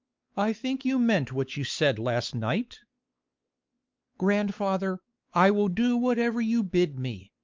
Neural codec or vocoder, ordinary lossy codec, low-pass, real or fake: codec, 16 kHz, 4 kbps, X-Codec, WavLM features, trained on Multilingual LibriSpeech; Opus, 24 kbps; 7.2 kHz; fake